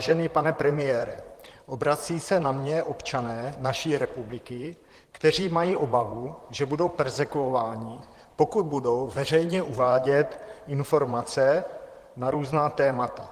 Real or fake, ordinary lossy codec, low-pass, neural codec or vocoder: fake; Opus, 24 kbps; 14.4 kHz; vocoder, 44.1 kHz, 128 mel bands, Pupu-Vocoder